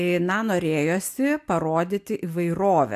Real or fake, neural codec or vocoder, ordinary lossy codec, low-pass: real; none; AAC, 96 kbps; 14.4 kHz